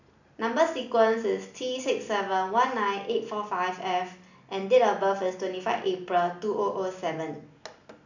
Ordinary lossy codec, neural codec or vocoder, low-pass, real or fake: none; none; 7.2 kHz; real